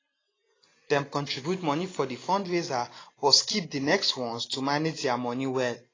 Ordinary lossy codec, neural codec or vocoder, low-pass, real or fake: AAC, 32 kbps; none; 7.2 kHz; real